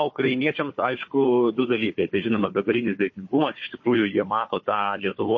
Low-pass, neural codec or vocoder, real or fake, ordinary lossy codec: 7.2 kHz; codec, 16 kHz, 4 kbps, FunCodec, trained on Chinese and English, 50 frames a second; fake; MP3, 32 kbps